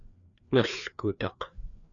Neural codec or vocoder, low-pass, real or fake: codec, 16 kHz, 2 kbps, FreqCodec, larger model; 7.2 kHz; fake